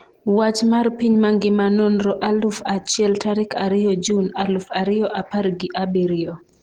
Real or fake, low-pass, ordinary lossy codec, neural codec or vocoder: real; 19.8 kHz; Opus, 16 kbps; none